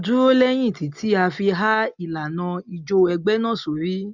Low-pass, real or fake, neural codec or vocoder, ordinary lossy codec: 7.2 kHz; real; none; none